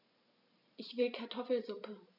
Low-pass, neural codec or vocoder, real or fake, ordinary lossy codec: 5.4 kHz; none; real; none